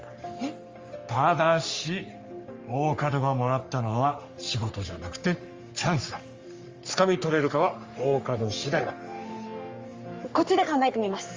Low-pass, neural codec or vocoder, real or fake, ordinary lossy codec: 7.2 kHz; codec, 44.1 kHz, 3.4 kbps, Pupu-Codec; fake; Opus, 32 kbps